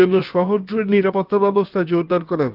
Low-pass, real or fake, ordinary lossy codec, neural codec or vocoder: 5.4 kHz; fake; Opus, 32 kbps; codec, 16 kHz, about 1 kbps, DyCAST, with the encoder's durations